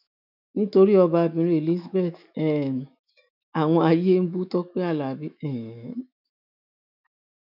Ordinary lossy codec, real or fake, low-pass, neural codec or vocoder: none; fake; 5.4 kHz; autoencoder, 48 kHz, 128 numbers a frame, DAC-VAE, trained on Japanese speech